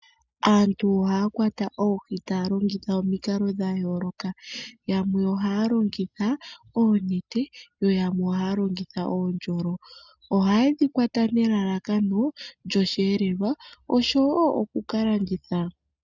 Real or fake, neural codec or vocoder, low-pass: real; none; 7.2 kHz